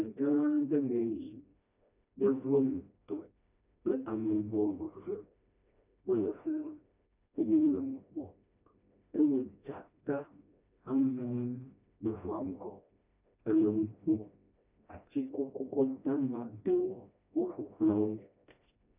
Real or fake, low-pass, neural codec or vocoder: fake; 3.6 kHz; codec, 16 kHz, 1 kbps, FreqCodec, smaller model